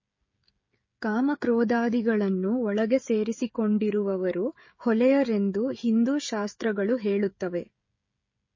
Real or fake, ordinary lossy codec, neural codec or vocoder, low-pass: fake; MP3, 32 kbps; codec, 16 kHz, 16 kbps, FreqCodec, smaller model; 7.2 kHz